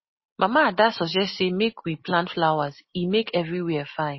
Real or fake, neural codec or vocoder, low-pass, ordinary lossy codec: real; none; 7.2 kHz; MP3, 24 kbps